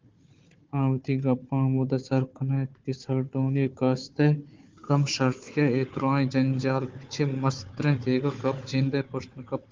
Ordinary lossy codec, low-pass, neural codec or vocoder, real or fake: Opus, 32 kbps; 7.2 kHz; codec, 16 kHz, 4 kbps, FunCodec, trained on Chinese and English, 50 frames a second; fake